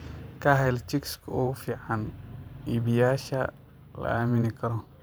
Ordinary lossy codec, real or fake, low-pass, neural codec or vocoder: none; fake; none; vocoder, 44.1 kHz, 128 mel bands every 512 samples, BigVGAN v2